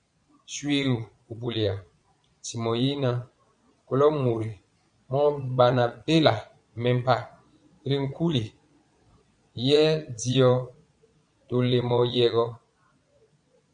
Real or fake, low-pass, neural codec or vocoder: fake; 9.9 kHz; vocoder, 22.05 kHz, 80 mel bands, Vocos